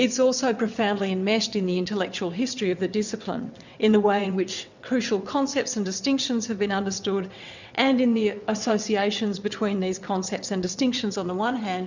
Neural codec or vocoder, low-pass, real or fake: vocoder, 22.05 kHz, 80 mel bands, WaveNeXt; 7.2 kHz; fake